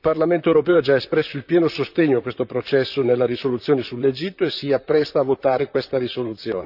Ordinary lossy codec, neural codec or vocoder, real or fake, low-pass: none; vocoder, 44.1 kHz, 128 mel bands, Pupu-Vocoder; fake; 5.4 kHz